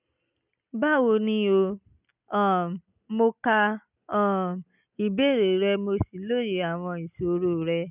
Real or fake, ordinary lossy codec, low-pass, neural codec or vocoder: real; none; 3.6 kHz; none